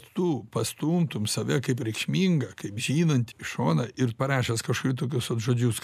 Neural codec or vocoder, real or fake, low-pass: none; real; 14.4 kHz